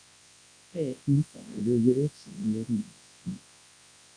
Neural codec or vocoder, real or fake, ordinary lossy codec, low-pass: codec, 24 kHz, 0.9 kbps, WavTokenizer, large speech release; fake; AAC, 64 kbps; 9.9 kHz